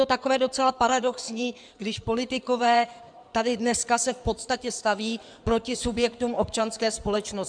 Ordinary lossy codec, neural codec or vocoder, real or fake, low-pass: MP3, 96 kbps; codec, 16 kHz in and 24 kHz out, 2.2 kbps, FireRedTTS-2 codec; fake; 9.9 kHz